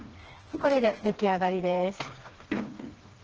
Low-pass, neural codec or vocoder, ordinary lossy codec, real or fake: 7.2 kHz; codec, 16 kHz, 2 kbps, FreqCodec, smaller model; Opus, 16 kbps; fake